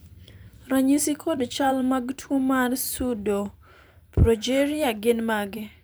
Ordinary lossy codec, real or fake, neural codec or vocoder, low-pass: none; fake; vocoder, 44.1 kHz, 128 mel bands every 512 samples, BigVGAN v2; none